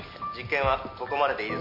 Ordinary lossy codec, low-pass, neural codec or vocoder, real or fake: none; 5.4 kHz; none; real